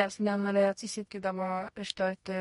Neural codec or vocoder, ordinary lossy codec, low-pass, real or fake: codec, 24 kHz, 0.9 kbps, WavTokenizer, medium music audio release; MP3, 48 kbps; 10.8 kHz; fake